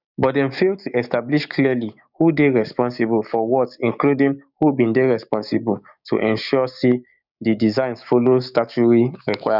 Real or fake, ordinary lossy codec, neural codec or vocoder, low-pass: fake; none; codec, 16 kHz, 6 kbps, DAC; 5.4 kHz